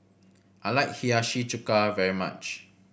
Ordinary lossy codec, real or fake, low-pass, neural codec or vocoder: none; real; none; none